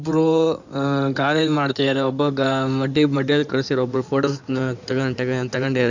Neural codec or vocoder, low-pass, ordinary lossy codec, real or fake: codec, 16 kHz in and 24 kHz out, 2.2 kbps, FireRedTTS-2 codec; 7.2 kHz; none; fake